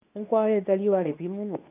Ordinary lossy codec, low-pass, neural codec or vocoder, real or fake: none; 3.6 kHz; codec, 24 kHz, 0.9 kbps, WavTokenizer, medium speech release version 2; fake